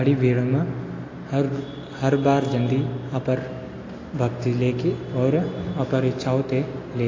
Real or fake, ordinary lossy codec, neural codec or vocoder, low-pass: real; AAC, 32 kbps; none; 7.2 kHz